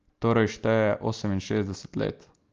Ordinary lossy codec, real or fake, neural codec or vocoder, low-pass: Opus, 32 kbps; real; none; 7.2 kHz